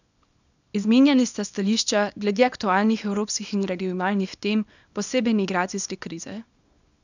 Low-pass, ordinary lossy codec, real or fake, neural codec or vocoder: 7.2 kHz; none; fake; codec, 24 kHz, 0.9 kbps, WavTokenizer, small release